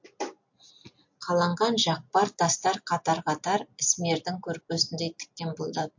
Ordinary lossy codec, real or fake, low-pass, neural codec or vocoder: MP3, 48 kbps; real; 7.2 kHz; none